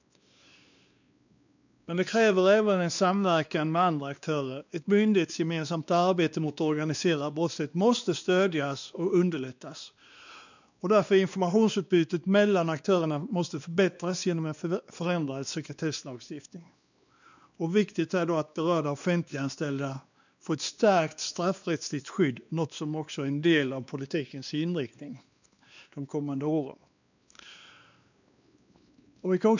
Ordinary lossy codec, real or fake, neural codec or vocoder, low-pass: none; fake; codec, 16 kHz, 2 kbps, X-Codec, WavLM features, trained on Multilingual LibriSpeech; 7.2 kHz